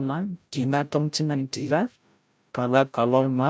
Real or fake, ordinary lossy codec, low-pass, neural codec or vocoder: fake; none; none; codec, 16 kHz, 0.5 kbps, FreqCodec, larger model